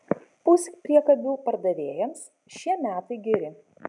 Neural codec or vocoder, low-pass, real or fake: none; 10.8 kHz; real